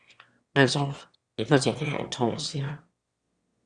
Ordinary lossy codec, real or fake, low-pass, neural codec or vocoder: Opus, 64 kbps; fake; 9.9 kHz; autoencoder, 22.05 kHz, a latent of 192 numbers a frame, VITS, trained on one speaker